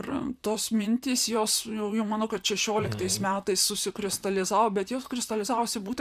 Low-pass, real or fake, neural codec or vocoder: 14.4 kHz; fake; vocoder, 44.1 kHz, 128 mel bands, Pupu-Vocoder